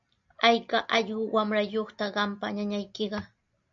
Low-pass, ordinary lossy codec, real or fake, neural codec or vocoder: 7.2 kHz; AAC, 64 kbps; real; none